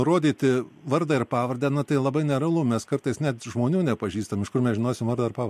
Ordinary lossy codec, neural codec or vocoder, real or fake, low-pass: MP3, 64 kbps; none; real; 14.4 kHz